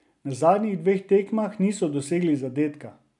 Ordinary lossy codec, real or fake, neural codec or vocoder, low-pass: none; real; none; 10.8 kHz